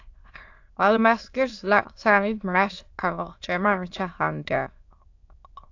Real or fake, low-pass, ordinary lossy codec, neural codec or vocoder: fake; 7.2 kHz; AAC, 48 kbps; autoencoder, 22.05 kHz, a latent of 192 numbers a frame, VITS, trained on many speakers